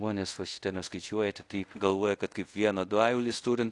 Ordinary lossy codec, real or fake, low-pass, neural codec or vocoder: AAC, 48 kbps; fake; 10.8 kHz; codec, 24 kHz, 0.5 kbps, DualCodec